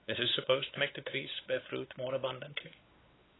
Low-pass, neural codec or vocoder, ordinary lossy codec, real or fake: 7.2 kHz; codec, 16 kHz, 4 kbps, X-Codec, WavLM features, trained on Multilingual LibriSpeech; AAC, 16 kbps; fake